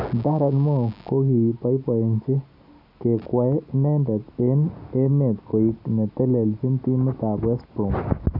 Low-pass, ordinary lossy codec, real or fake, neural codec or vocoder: 5.4 kHz; none; real; none